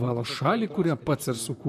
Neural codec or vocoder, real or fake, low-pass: vocoder, 44.1 kHz, 128 mel bands, Pupu-Vocoder; fake; 14.4 kHz